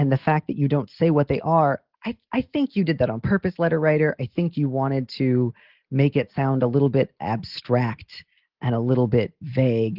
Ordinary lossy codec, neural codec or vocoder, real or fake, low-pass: Opus, 16 kbps; none; real; 5.4 kHz